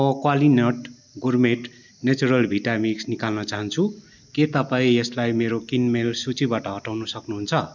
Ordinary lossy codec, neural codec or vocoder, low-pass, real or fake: none; none; 7.2 kHz; real